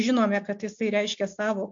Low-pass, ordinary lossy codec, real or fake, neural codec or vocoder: 7.2 kHz; MP3, 48 kbps; real; none